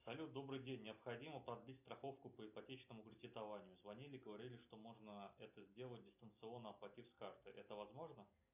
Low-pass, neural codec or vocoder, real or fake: 3.6 kHz; none; real